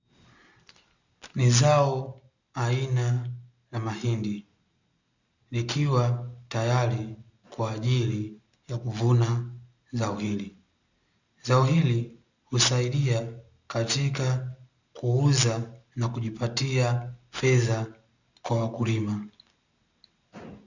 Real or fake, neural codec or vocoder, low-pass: real; none; 7.2 kHz